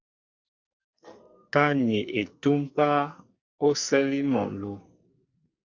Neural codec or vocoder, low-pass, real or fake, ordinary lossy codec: codec, 44.1 kHz, 2.6 kbps, SNAC; 7.2 kHz; fake; Opus, 64 kbps